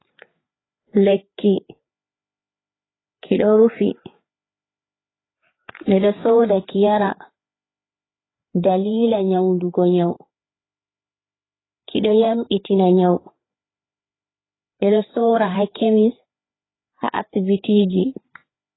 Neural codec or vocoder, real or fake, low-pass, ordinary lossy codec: codec, 16 kHz, 4 kbps, FreqCodec, larger model; fake; 7.2 kHz; AAC, 16 kbps